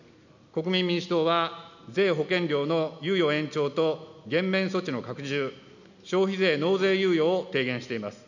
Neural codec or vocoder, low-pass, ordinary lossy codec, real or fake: none; 7.2 kHz; none; real